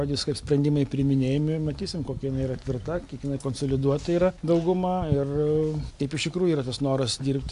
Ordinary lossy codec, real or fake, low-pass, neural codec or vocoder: AAC, 64 kbps; real; 10.8 kHz; none